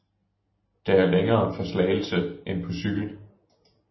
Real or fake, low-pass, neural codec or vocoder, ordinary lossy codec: real; 7.2 kHz; none; MP3, 24 kbps